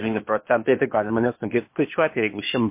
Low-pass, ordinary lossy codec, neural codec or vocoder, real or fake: 3.6 kHz; MP3, 24 kbps; codec, 16 kHz, 0.8 kbps, ZipCodec; fake